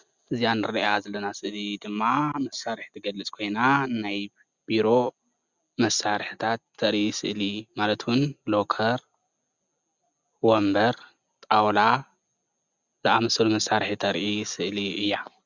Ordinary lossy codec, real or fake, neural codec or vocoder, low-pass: Opus, 64 kbps; real; none; 7.2 kHz